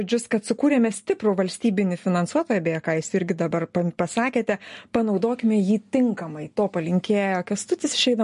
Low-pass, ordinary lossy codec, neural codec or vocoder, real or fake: 10.8 kHz; MP3, 48 kbps; none; real